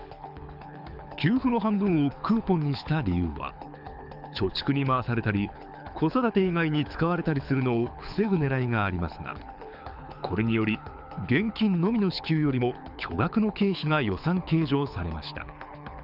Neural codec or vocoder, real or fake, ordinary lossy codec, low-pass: codec, 24 kHz, 6 kbps, HILCodec; fake; none; 5.4 kHz